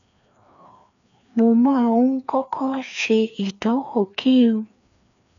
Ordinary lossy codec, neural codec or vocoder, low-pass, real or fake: MP3, 96 kbps; codec, 16 kHz, 2 kbps, FreqCodec, larger model; 7.2 kHz; fake